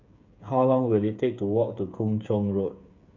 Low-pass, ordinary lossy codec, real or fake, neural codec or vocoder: 7.2 kHz; none; fake; codec, 16 kHz, 8 kbps, FreqCodec, smaller model